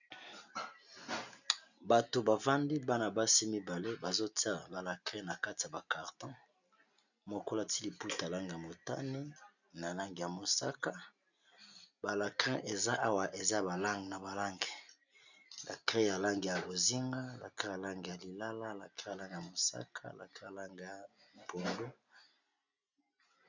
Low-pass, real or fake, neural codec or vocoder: 7.2 kHz; real; none